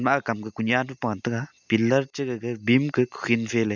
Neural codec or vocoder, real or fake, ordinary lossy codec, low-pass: none; real; none; 7.2 kHz